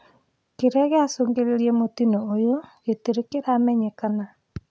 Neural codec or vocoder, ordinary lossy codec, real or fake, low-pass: none; none; real; none